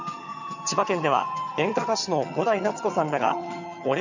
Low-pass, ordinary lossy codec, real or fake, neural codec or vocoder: 7.2 kHz; none; fake; vocoder, 22.05 kHz, 80 mel bands, HiFi-GAN